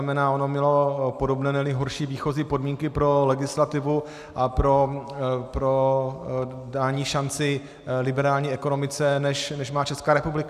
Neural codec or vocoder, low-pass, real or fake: none; 14.4 kHz; real